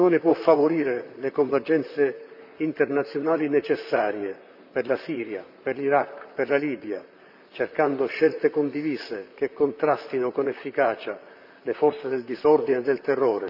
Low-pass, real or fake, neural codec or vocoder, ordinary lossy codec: 5.4 kHz; fake; vocoder, 44.1 kHz, 128 mel bands, Pupu-Vocoder; none